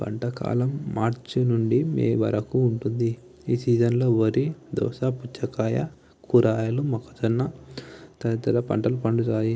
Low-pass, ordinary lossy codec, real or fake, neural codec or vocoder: none; none; real; none